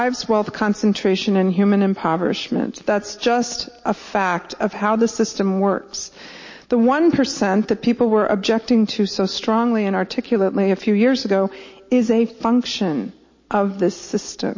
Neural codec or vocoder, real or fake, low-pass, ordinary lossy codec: none; real; 7.2 kHz; MP3, 32 kbps